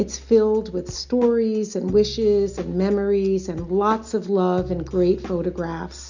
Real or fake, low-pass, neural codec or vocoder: real; 7.2 kHz; none